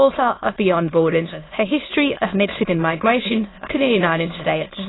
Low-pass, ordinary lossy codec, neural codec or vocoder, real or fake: 7.2 kHz; AAC, 16 kbps; autoencoder, 22.05 kHz, a latent of 192 numbers a frame, VITS, trained on many speakers; fake